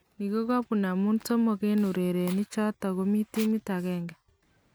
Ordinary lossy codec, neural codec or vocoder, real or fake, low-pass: none; none; real; none